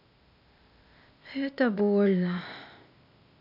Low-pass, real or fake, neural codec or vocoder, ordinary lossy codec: 5.4 kHz; fake; codec, 16 kHz, 0.8 kbps, ZipCodec; none